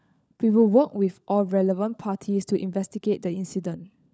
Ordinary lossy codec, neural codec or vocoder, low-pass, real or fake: none; codec, 16 kHz, 16 kbps, FunCodec, trained on LibriTTS, 50 frames a second; none; fake